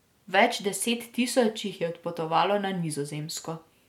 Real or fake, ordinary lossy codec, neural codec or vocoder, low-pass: real; MP3, 96 kbps; none; 19.8 kHz